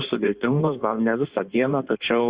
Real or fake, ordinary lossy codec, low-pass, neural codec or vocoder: fake; Opus, 64 kbps; 3.6 kHz; codec, 16 kHz in and 24 kHz out, 1.1 kbps, FireRedTTS-2 codec